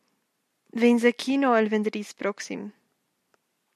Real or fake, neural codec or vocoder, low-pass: real; none; 14.4 kHz